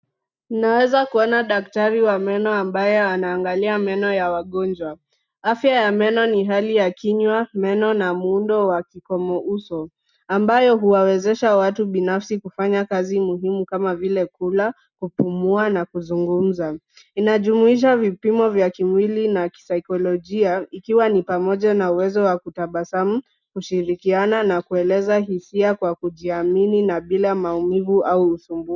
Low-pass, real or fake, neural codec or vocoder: 7.2 kHz; real; none